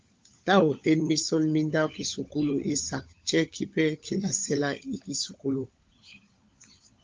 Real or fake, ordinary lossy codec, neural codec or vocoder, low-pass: fake; Opus, 32 kbps; codec, 16 kHz, 16 kbps, FunCodec, trained on LibriTTS, 50 frames a second; 7.2 kHz